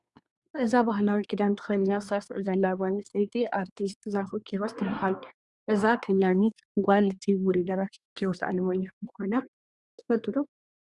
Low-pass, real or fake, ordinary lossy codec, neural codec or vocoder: 10.8 kHz; fake; Opus, 64 kbps; codec, 24 kHz, 1 kbps, SNAC